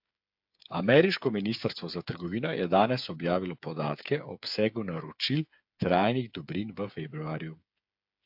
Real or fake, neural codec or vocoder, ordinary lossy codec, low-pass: fake; codec, 16 kHz, 8 kbps, FreqCodec, smaller model; AAC, 48 kbps; 5.4 kHz